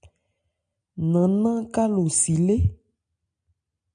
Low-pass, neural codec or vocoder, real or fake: 9.9 kHz; none; real